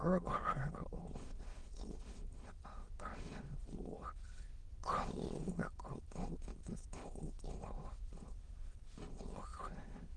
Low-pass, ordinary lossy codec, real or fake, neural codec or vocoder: 9.9 kHz; Opus, 16 kbps; fake; autoencoder, 22.05 kHz, a latent of 192 numbers a frame, VITS, trained on many speakers